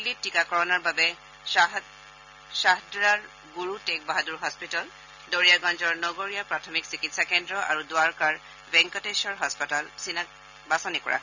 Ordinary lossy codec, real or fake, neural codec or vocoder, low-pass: none; real; none; 7.2 kHz